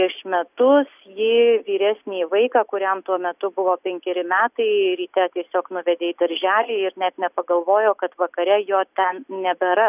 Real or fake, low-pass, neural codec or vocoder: real; 3.6 kHz; none